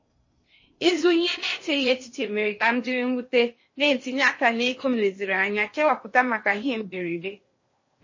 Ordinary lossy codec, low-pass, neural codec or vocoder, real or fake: MP3, 32 kbps; 7.2 kHz; codec, 16 kHz in and 24 kHz out, 0.8 kbps, FocalCodec, streaming, 65536 codes; fake